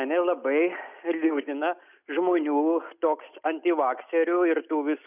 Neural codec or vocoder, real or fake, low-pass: none; real; 3.6 kHz